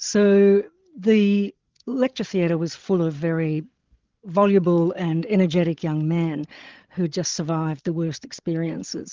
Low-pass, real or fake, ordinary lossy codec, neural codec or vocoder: 7.2 kHz; fake; Opus, 16 kbps; codec, 16 kHz, 16 kbps, FreqCodec, larger model